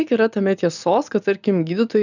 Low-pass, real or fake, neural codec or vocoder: 7.2 kHz; real; none